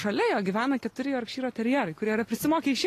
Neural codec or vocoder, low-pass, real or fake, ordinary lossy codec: none; 14.4 kHz; real; AAC, 48 kbps